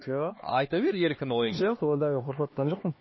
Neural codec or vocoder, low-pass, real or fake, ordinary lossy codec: codec, 16 kHz, 2 kbps, X-Codec, HuBERT features, trained on LibriSpeech; 7.2 kHz; fake; MP3, 24 kbps